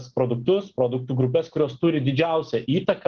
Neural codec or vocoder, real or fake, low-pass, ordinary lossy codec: none; real; 7.2 kHz; Opus, 16 kbps